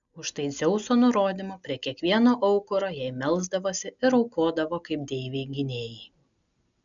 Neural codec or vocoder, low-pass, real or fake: none; 7.2 kHz; real